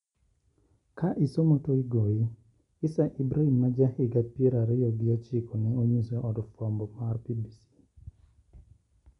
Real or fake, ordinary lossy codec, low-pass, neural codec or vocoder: real; MP3, 96 kbps; 10.8 kHz; none